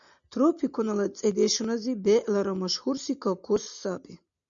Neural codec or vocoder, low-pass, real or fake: none; 7.2 kHz; real